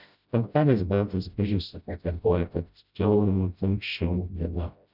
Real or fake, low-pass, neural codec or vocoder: fake; 5.4 kHz; codec, 16 kHz, 0.5 kbps, FreqCodec, smaller model